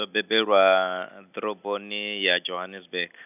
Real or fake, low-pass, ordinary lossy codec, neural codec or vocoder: real; 3.6 kHz; none; none